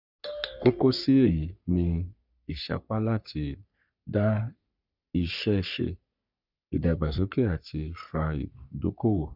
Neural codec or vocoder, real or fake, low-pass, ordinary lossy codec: codec, 44.1 kHz, 3.4 kbps, Pupu-Codec; fake; 5.4 kHz; none